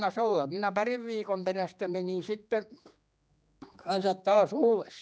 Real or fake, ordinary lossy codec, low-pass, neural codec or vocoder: fake; none; none; codec, 16 kHz, 2 kbps, X-Codec, HuBERT features, trained on general audio